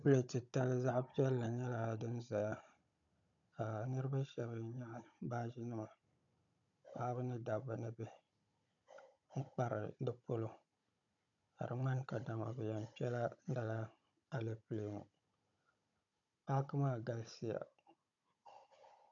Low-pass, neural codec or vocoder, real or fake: 7.2 kHz; codec, 16 kHz, 16 kbps, FunCodec, trained on Chinese and English, 50 frames a second; fake